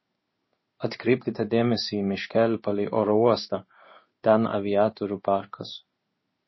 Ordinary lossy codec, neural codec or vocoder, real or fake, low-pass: MP3, 24 kbps; codec, 16 kHz in and 24 kHz out, 1 kbps, XY-Tokenizer; fake; 7.2 kHz